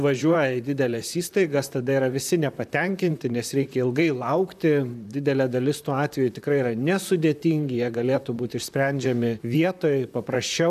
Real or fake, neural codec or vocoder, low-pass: fake; vocoder, 44.1 kHz, 128 mel bands, Pupu-Vocoder; 14.4 kHz